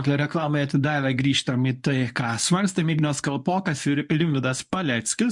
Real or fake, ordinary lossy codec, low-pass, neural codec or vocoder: fake; MP3, 64 kbps; 10.8 kHz; codec, 24 kHz, 0.9 kbps, WavTokenizer, medium speech release version 1